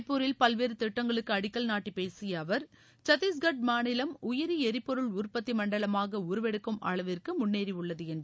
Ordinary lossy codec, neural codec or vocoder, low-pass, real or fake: none; none; none; real